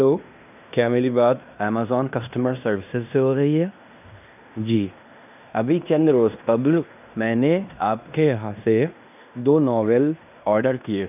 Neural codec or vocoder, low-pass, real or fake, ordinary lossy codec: codec, 16 kHz in and 24 kHz out, 0.9 kbps, LongCat-Audio-Codec, fine tuned four codebook decoder; 3.6 kHz; fake; none